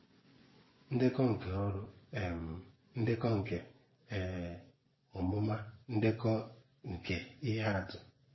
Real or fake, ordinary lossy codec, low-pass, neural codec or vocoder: fake; MP3, 24 kbps; 7.2 kHz; vocoder, 24 kHz, 100 mel bands, Vocos